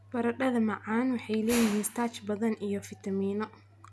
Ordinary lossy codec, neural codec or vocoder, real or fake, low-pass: none; none; real; none